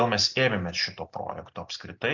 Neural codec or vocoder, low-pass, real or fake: none; 7.2 kHz; real